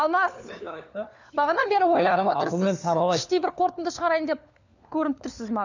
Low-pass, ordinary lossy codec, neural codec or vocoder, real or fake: 7.2 kHz; none; codec, 16 kHz, 4 kbps, FunCodec, trained on LibriTTS, 50 frames a second; fake